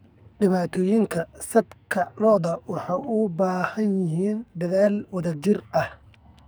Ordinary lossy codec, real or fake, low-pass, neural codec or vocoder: none; fake; none; codec, 44.1 kHz, 2.6 kbps, SNAC